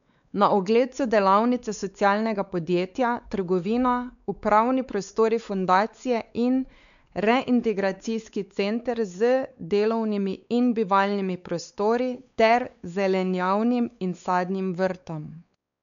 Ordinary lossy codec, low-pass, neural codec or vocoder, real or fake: none; 7.2 kHz; codec, 16 kHz, 4 kbps, X-Codec, WavLM features, trained on Multilingual LibriSpeech; fake